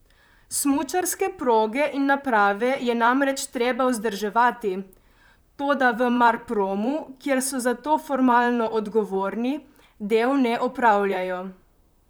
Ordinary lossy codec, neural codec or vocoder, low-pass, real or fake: none; vocoder, 44.1 kHz, 128 mel bands, Pupu-Vocoder; none; fake